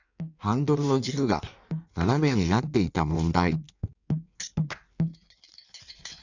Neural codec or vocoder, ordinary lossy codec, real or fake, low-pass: codec, 16 kHz in and 24 kHz out, 1.1 kbps, FireRedTTS-2 codec; none; fake; 7.2 kHz